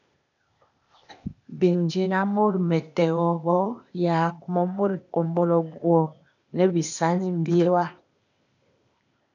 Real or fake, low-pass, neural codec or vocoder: fake; 7.2 kHz; codec, 16 kHz, 0.8 kbps, ZipCodec